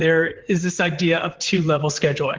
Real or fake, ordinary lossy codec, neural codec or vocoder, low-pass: fake; Opus, 16 kbps; vocoder, 44.1 kHz, 128 mel bands, Pupu-Vocoder; 7.2 kHz